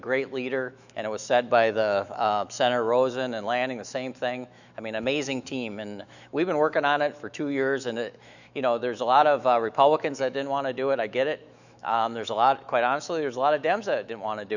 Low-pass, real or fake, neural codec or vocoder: 7.2 kHz; fake; autoencoder, 48 kHz, 128 numbers a frame, DAC-VAE, trained on Japanese speech